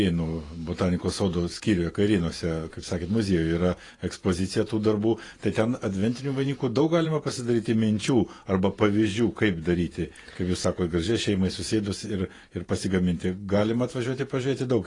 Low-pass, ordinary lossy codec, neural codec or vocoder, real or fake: 10.8 kHz; AAC, 32 kbps; vocoder, 48 kHz, 128 mel bands, Vocos; fake